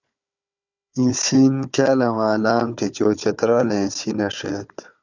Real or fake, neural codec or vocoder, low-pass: fake; codec, 16 kHz, 4 kbps, FunCodec, trained on Chinese and English, 50 frames a second; 7.2 kHz